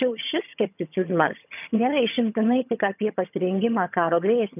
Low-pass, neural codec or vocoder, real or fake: 3.6 kHz; vocoder, 22.05 kHz, 80 mel bands, HiFi-GAN; fake